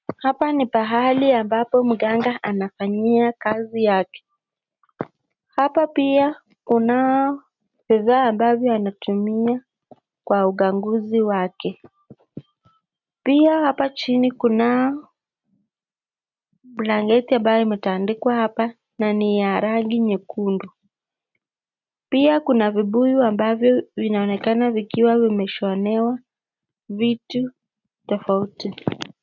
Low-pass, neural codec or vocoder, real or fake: 7.2 kHz; none; real